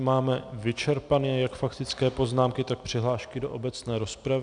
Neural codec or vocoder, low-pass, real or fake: none; 9.9 kHz; real